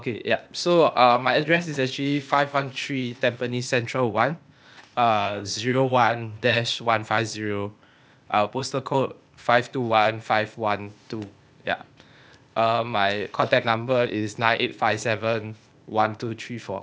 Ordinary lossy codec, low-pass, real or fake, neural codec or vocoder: none; none; fake; codec, 16 kHz, 0.8 kbps, ZipCodec